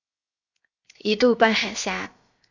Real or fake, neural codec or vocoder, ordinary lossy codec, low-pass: fake; codec, 16 kHz, 0.7 kbps, FocalCodec; Opus, 64 kbps; 7.2 kHz